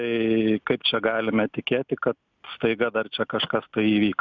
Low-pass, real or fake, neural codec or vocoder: 7.2 kHz; real; none